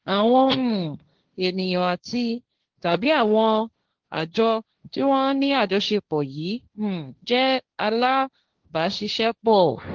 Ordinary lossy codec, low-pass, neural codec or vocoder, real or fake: Opus, 16 kbps; 7.2 kHz; codec, 16 kHz, 1.1 kbps, Voila-Tokenizer; fake